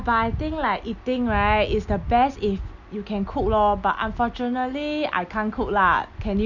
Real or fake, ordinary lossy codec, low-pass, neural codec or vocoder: real; none; 7.2 kHz; none